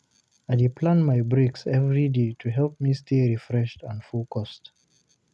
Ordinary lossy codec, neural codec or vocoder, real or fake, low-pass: none; none; real; none